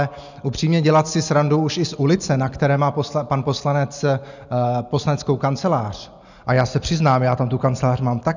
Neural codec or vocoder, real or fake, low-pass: none; real; 7.2 kHz